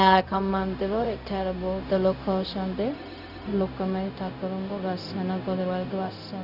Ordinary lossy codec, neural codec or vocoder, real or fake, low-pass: none; codec, 16 kHz, 0.4 kbps, LongCat-Audio-Codec; fake; 5.4 kHz